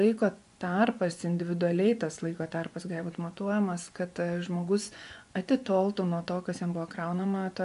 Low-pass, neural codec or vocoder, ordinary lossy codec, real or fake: 10.8 kHz; vocoder, 24 kHz, 100 mel bands, Vocos; MP3, 96 kbps; fake